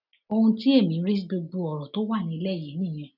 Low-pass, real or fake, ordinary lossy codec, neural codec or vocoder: 5.4 kHz; real; none; none